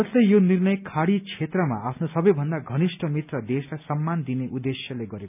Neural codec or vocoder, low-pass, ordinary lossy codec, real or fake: none; 3.6 kHz; none; real